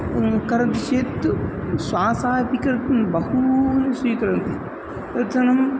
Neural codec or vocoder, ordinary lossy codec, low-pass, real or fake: none; none; none; real